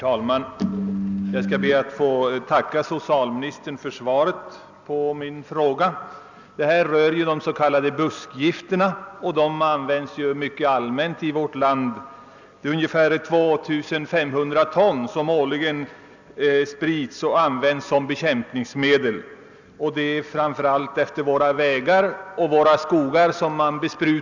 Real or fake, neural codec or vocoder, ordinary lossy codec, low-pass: real; none; none; 7.2 kHz